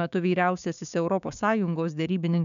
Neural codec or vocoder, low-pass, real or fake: codec, 16 kHz, 6 kbps, DAC; 7.2 kHz; fake